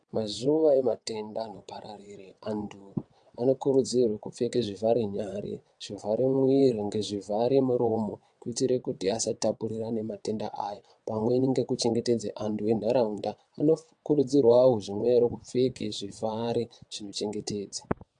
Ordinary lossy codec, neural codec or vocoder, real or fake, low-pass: AAC, 64 kbps; vocoder, 22.05 kHz, 80 mel bands, WaveNeXt; fake; 9.9 kHz